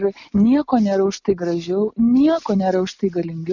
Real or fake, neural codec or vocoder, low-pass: real; none; 7.2 kHz